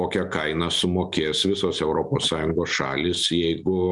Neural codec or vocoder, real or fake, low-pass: none; real; 10.8 kHz